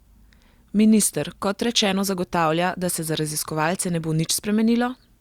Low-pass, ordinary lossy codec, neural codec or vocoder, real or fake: 19.8 kHz; Opus, 64 kbps; none; real